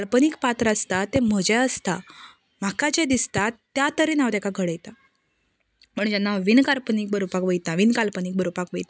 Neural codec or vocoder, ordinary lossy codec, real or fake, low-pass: none; none; real; none